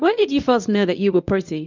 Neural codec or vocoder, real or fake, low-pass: codec, 24 kHz, 0.9 kbps, WavTokenizer, medium speech release version 1; fake; 7.2 kHz